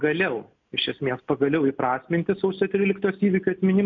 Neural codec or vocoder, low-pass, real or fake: none; 7.2 kHz; real